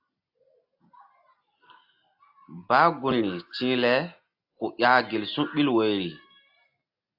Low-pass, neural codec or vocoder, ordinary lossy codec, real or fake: 5.4 kHz; none; AAC, 48 kbps; real